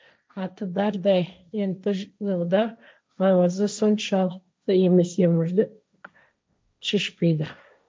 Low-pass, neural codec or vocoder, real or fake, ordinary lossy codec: none; codec, 16 kHz, 1.1 kbps, Voila-Tokenizer; fake; none